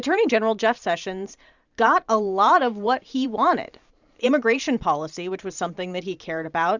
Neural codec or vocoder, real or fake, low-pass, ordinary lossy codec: vocoder, 44.1 kHz, 128 mel bands every 512 samples, BigVGAN v2; fake; 7.2 kHz; Opus, 64 kbps